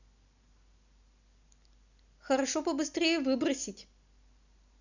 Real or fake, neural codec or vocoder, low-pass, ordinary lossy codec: real; none; 7.2 kHz; none